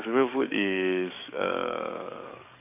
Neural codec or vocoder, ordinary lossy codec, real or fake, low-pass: codec, 44.1 kHz, 7.8 kbps, DAC; none; fake; 3.6 kHz